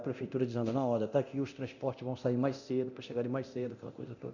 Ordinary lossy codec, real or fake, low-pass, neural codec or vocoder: none; fake; 7.2 kHz; codec, 24 kHz, 0.9 kbps, DualCodec